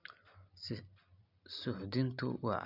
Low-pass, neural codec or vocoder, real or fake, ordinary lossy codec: 5.4 kHz; none; real; none